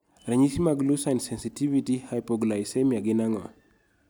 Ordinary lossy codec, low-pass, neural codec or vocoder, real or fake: none; none; none; real